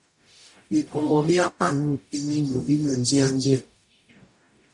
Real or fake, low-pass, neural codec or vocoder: fake; 10.8 kHz; codec, 44.1 kHz, 0.9 kbps, DAC